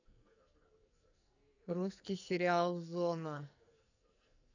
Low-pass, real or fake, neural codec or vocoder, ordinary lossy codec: 7.2 kHz; fake; codec, 44.1 kHz, 2.6 kbps, SNAC; none